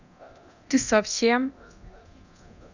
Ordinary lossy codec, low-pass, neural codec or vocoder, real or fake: none; 7.2 kHz; codec, 24 kHz, 0.9 kbps, DualCodec; fake